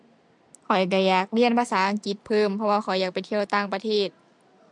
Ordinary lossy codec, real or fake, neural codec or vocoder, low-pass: MP3, 64 kbps; real; none; 10.8 kHz